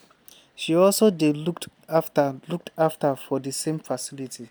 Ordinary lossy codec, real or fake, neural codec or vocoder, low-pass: none; real; none; none